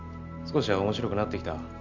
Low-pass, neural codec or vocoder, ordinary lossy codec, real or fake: 7.2 kHz; none; none; real